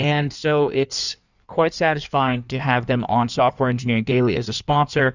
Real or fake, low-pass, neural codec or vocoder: fake; 7.2 kHz; codec, 16 kHz in and 24 kHz out, 1.1 kbps, FireRedTTS-2 codec